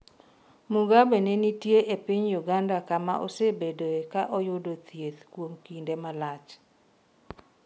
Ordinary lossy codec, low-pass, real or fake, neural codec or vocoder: none; none; real; none